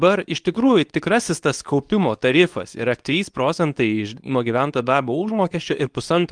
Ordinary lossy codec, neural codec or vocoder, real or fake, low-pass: Opus, 32 kbps; codec, 24 kHz, 0.9 kbps, WavTokenizer, medium speech release version 2; fake; 9.9 kHz